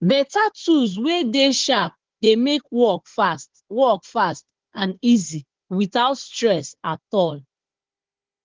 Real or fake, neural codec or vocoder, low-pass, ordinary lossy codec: fake; codec, 16 kHz, 4 kbps, FunCodec, trained on Chinese and English, 50 frames a second; 7.2 kHz; Opus, 16 kbps